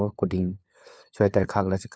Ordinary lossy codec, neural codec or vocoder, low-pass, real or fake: none; codec, 16 kHz, 4 kbps, FunCodec, trained on LibriTTS, 50 frames a second; none; fake